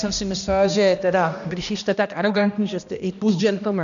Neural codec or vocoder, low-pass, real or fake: codec, 16 kHz, 1 kbps, X-Codec, HuBERT features, trained on balanced general audio; 7.2 kHz; fake